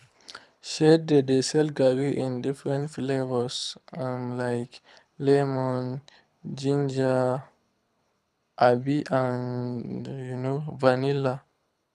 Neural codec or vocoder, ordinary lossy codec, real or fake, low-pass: codec, 24 kHz, 6 kbps, HILCodec; none; fake; none